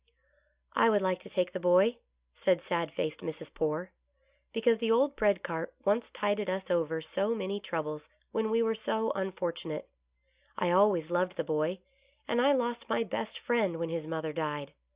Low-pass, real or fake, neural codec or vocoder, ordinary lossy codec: 3.6 kHz; real; none; Opus, 64 kbps